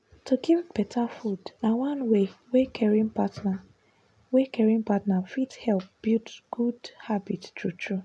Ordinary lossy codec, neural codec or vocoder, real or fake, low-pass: none; none; real; none